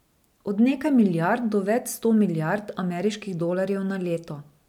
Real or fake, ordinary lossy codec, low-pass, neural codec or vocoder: real; none; 19.8 kHz; none